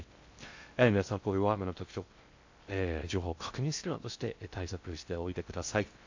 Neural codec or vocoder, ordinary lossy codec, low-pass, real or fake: codec, 16 kHz in and 24 kHz out, 0.6 kbps, FocalCodec, streaming, 2048 codes; AAC, 48 kbps; 7.2 kHz; fake